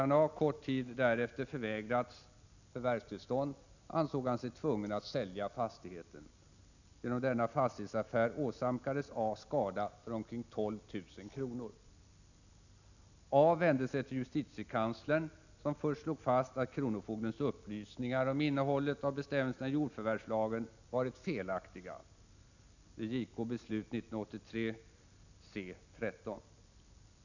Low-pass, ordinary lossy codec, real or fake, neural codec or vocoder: 7.2 kHz; none; real; none